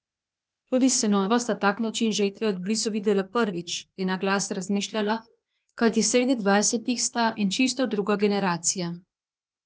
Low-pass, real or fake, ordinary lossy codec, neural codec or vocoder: none; fake; none; codec, 16 kHz, 0.8 kbps, ZipCodec